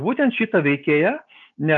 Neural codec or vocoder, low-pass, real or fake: none; 7.2 kHz; real